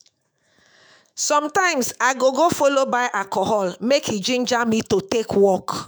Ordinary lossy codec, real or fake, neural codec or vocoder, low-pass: none; fake; autoencoder, 48 kHz, 128 numbers a frame, DAC-VAE, trained on Japanese speech; none